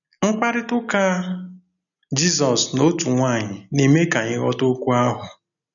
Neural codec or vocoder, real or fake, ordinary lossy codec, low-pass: none; real; none; 7.2 kHz